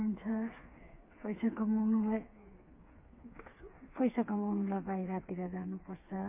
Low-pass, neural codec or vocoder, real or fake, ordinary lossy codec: 3.6 kHz; codec, 16 kHz, 4 kbps, FreqCodec, smaller model; fake; MP3, 24 kbps